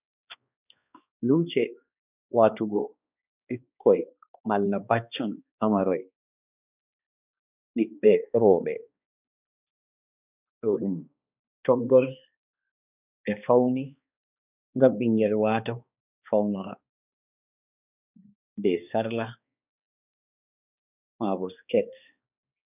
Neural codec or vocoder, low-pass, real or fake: codec, 16 kHz, 2 kbps, X-Codec, HuBERT features, trained on balanced general audio; 3.6 kHz; fake